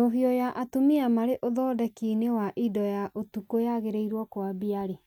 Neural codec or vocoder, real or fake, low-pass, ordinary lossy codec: none; real; 19.8 kHz; none